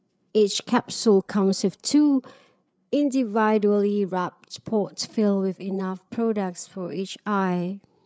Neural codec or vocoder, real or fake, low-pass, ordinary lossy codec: codec, 16 kHz, 8 kbps, FreqCodec, larger model; fake; none; none